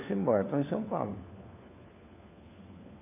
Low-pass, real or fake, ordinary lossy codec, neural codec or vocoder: 3.6 kHz; fake; AAC, 24 kbps; codec, 16 kHz, 4 kbps, FunCodec, trained on LibriTTS, 50 frames a second